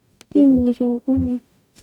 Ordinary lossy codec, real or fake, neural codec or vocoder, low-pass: none; fake; codec, 44.1 kHz, 0.9 kbps, DAC; 19.8 kHz